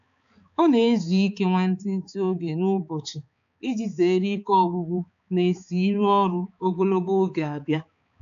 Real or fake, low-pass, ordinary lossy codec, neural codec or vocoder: fake; 7.2 kHz; AAC, 64 kbps; codec, 16 kHz, 4 kbps, X-Codec, HuBERT features, trained on balanced general audio